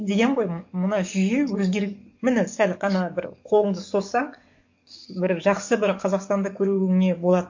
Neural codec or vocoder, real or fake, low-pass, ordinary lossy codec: codec, 44.1 kHz, 7.8 kbps, DAC; fake; 7.2 kHz; MP3, 48 kbps